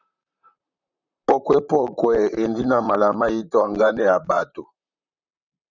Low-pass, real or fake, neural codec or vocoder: 7.2 kHz; fake; vocoder, 44.1 kHz, 128 mel bands, Pupu-Vocoder